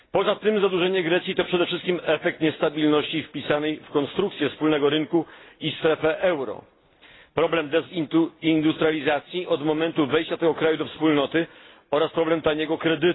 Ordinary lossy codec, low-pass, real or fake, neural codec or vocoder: AAC, 16 kbps; 7.2 kHz; real; none